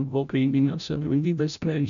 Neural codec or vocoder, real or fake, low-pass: codec, 16 kHz, 0.5 kbps, FreqCodec, larger model; fake; 7.2 kHz